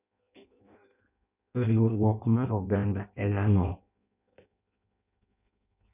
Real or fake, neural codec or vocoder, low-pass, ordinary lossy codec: fake; codec, 16 kHz in and 24 kHz out, 0.6 kbps, FireRedTTS-2 codec; 3.6 kHz; none